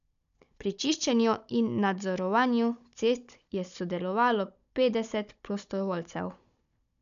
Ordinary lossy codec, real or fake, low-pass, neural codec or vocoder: none; fake; 7.2 kHz; codec, 16 kHz, 16 kbps, FunCodec, trained on Chinese and English, 50 frames a second